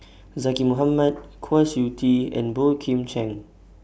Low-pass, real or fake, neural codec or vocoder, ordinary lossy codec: none; real; none; none